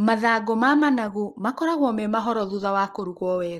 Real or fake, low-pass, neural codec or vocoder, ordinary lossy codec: real; 19.8 kHz; none; Opus, 24 kbps